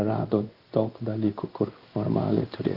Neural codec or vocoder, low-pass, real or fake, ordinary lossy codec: codec, 16 kHz in and 24 kHz out, 1 kbps, XY-Tokenizer; 5.4 kHz; fake; Opus, 32 kbps